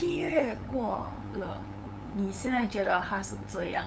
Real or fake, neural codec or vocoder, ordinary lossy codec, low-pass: fake; codec, 16 kHz, 8 kbps, FunCodec, trained on LibriTTS, 25 frames a second; none; none